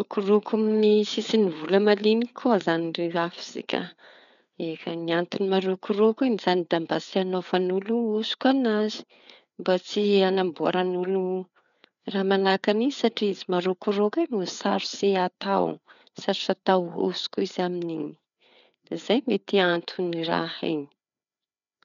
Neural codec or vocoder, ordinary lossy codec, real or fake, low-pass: codec, 16 kHz, 4 kbps, FreqCodec, larger model; none; fake; 7.2 kHz